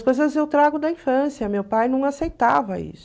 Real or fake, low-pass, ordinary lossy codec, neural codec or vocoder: real; none; none; none